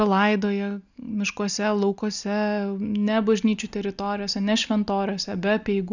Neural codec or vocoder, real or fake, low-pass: none; real; 7.2 kHz